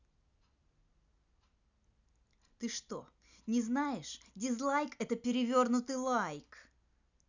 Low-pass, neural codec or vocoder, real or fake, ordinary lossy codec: 7.2 kHz; none; real; none